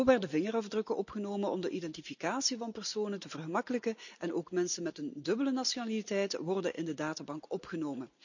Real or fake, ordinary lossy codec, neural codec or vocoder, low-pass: real; none; none; 7.2 kHz